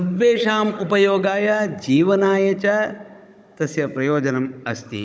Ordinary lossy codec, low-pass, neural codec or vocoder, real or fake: none; none; codec, 16 kHz, 16 kbps, FunCodec, trained on Chinese and English, 50 frames a second; fake